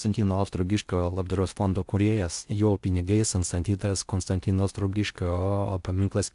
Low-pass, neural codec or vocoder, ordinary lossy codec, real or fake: 10.8 kHz; codec, 16 kHz in and 24 kHz out, 0.6 kbps, FocalCodec, streaming, 2048 codes; AAC, 96 kbps; fake